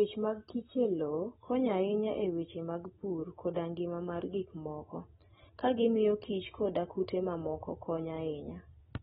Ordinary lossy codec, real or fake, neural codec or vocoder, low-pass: AAC, 16 kbps; real; none; 19.8 kHz